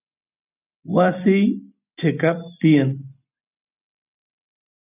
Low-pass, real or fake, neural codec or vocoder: 3.6 kHz; real; none